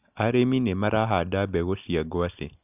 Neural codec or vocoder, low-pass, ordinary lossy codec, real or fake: none; 3.6 kHz; none; real